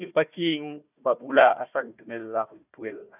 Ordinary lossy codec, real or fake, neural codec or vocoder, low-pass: none; fake; codec, 16 kHz, 1 kbps, FunCodec, trained on Chinese and English, 50 frames a second; 3.6 kHz